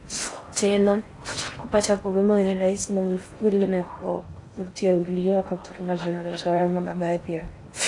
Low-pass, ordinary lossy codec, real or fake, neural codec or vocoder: 10.8 kHz; AAC, 48 kbps; fake; codec, 16 kHz in and 24 kHz out, 0.6 kbps, FocalCodec, streaming, 4096 codes